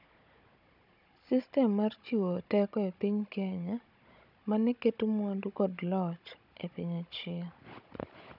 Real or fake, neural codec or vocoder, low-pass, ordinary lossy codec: fake; codec, 16 kHz, 16 kbps, FunCodec, trained on Chinese and English, 50 frames a second; 5.4 kHz; none